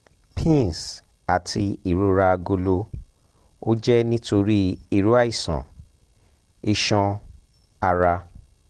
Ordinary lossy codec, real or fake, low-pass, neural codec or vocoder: Opus, 16 kbps; real; 10.8 kHz; none